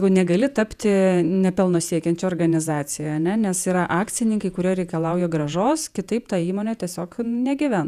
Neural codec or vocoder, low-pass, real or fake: vocoder, 48 kHz, 128 mel bands, Vocos; 14.4 kHz; fake